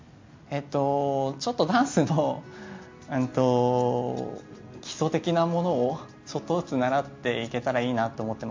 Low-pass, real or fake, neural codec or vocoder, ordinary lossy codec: 7.2 kHz; real; none; MP3, 48 kbps